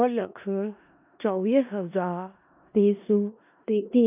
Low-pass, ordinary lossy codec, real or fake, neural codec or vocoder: 3.6 kHz; none; fake; codec, 16 kHz in and 24 kHz out, 0.4 kbps, LongCat-Audio-Codec, four codebook decoder